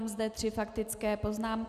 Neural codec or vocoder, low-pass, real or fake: none; 14.4 kHz; real